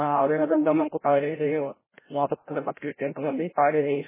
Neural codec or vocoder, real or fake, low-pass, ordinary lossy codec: codec, 16 kHz, 0.5 kbps, FreqCodec, larger model; fake; 3.6 kHz; MP3, 16 kbps